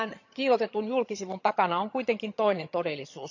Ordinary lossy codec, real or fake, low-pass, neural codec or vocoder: none; fake; 7.2 kHz; vocoder, 22.05 kHz, 80 mel bands, HiFi-GAN